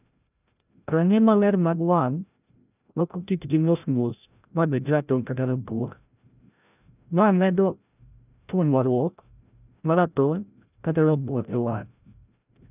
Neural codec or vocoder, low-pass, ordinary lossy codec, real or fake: codec, 16 kHz, 0.5 kbps, FreqCodec, larger model; 3.6 kHz; none; fake